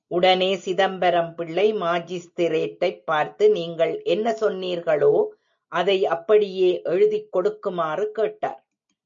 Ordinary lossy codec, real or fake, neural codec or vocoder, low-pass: MP3, 48 kbps; real; none; 7.2 kHz